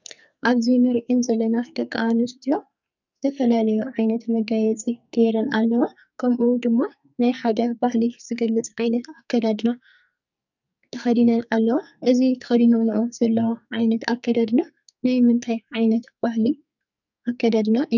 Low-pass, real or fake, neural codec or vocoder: 7.2 kHz; fake; codec, 44.1 kHz, 2.6 kbps, SNAC